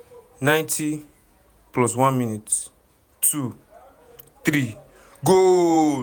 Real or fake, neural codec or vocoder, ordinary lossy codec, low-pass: fake; vocoder, 48 kHz, 128 mel bands, Vocos; none; none